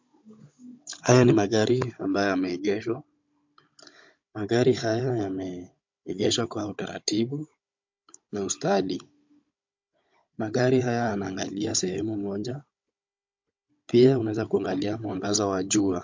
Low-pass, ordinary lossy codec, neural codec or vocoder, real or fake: 7.2 kHz; MP3, 48 kbps; codec, 16 kHz, 16 kbps, FunCodec, trained on Chinese and English, 50 frames a second; fake